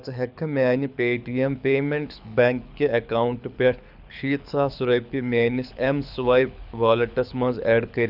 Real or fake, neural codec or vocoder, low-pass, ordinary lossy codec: fake; codec, 24 kHz, 6 kbps, HILCodec; 5.4 kHz; none